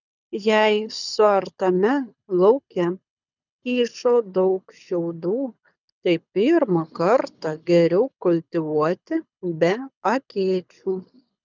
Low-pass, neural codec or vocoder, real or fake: 7.2 kHz; codec, 24 kHz, 6 kbps, HILCodec; fake